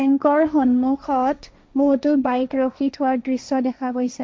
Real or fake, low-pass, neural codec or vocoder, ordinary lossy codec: fake; none; codec, 16 kHz, 1.1 kbps, Voila-Tokenizer; none